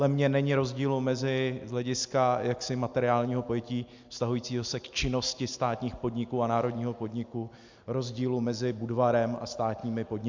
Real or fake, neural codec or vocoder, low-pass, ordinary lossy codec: real; none; 7.2 kHz; MP3, 64 kbps